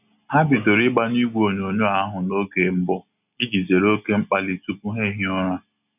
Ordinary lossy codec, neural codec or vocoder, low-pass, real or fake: none; none; 3.6 kHz; real